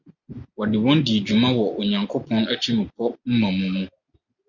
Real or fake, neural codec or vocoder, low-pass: real; none; 7.2 kHz